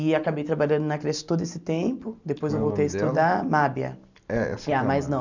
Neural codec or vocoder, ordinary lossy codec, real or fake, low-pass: none; none; real; 7.2 kHz